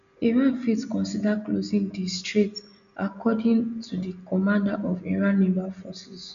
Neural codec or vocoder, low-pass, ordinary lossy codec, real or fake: none; 7.2 kHz; none; real